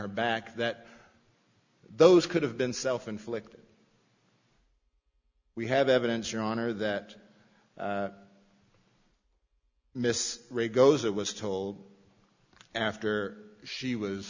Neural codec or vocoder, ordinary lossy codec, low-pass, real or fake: none; Opus, 64 kbps; 7.2 kHz; real